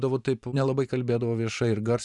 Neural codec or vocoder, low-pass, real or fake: vocoder, 44.1 kHz, 128 mel bands every 512 samples, BigVGAN v2; 10.8 kHz; fake